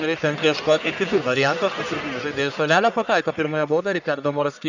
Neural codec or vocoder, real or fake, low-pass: codec, 44.1 kHz, 1.7 kbps, Pupu-Codec; fake; 7.2 kHz